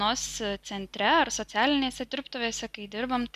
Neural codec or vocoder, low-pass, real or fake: none; 14.4 kHz; real